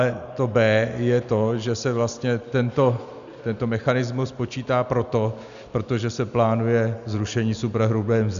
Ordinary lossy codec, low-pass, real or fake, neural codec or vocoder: MP3, 96 kbps; 7.2 kHz; real; none